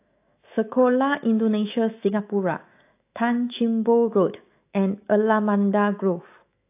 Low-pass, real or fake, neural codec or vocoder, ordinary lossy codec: 3.6 kHz; fake; autoencoder, 48 kHz, 128 numbers a frame, DAC-VAE, trained on Japanese speech; AAC, 24 kbps